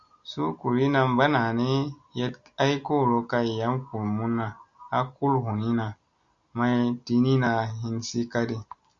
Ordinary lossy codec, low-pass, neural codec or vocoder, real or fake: Opus, 64 kbps; 7.2 kHz; none; real